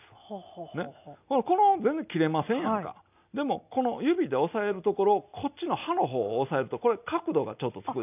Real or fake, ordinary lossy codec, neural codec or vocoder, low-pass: real; none; none; 3.6 kHz